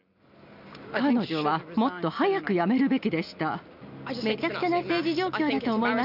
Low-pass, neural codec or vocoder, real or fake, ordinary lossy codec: 5.4 kHz; none; real; none